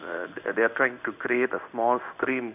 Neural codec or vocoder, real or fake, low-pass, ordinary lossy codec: codec, 16 kHz in and 24 kHz out, 1 kbps, XY-Tokenizer; fake; 3.6 kHz; none